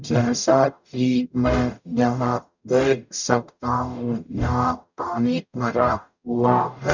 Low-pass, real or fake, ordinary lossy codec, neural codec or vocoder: 7.2 kHz; fake; none; codec, 44.1 kHz, 0.9 kbps, DAC